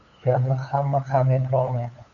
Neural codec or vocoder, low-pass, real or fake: codec, 16 kHz, 8 kbps, FunCodec, trained on LibriTTS, 25 frames a second; 7.2 kHz; fake